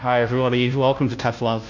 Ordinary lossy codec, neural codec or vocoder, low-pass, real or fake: AAC, 48 kbps; codec, 16 kHz, 0.5 kbps, FunCodec, trained on Chinese and English, 25 frames a second; 7.2 kHz; fake